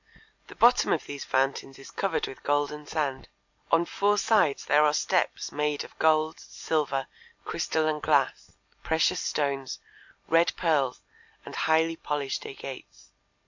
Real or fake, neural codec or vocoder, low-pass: real; none; 7.2 kHz